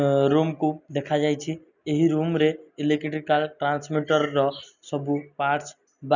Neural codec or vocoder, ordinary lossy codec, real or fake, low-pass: none; none; real; 7.2 kHz